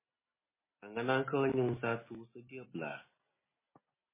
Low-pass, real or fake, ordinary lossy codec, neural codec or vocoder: 3.6 kHz; real; MP3, 16 kbps; none